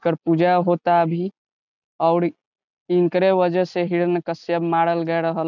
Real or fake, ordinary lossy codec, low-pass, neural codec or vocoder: real; none; 7.2 kHz; none